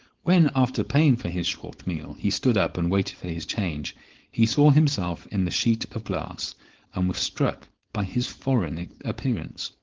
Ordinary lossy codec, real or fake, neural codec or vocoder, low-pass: Opus, 16 kbps; fake; codec, 16 kHz, 4.8 kbps, FACodec; 7.2 kHz